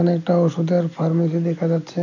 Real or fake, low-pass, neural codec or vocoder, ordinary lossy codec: real; 7.2 kHz; none; none